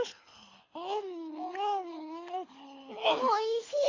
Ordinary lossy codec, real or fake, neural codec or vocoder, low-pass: AAC, 32 kbps; fake; codec, 24 kHz, 6 kbps, HILCodec; 7.2 kHz